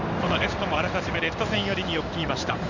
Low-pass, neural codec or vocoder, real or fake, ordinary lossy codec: 7.2 kHz; codec, 16 kHz in and 24 kHz out, 1 kbps, XY-Tokenizer; fake; none